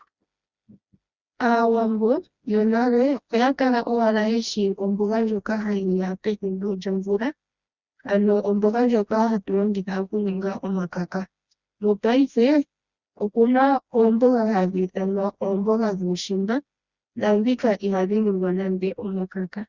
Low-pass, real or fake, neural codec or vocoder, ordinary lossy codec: 7.2 kHz; fake; codec, 16 kHz, 1 kbps, FreqCodec, smaller model; Opus, 64 kbps